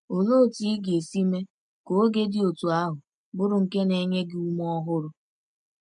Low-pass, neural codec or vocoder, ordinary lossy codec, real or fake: 9.9 kHz; none; MP3, 64 kbps; real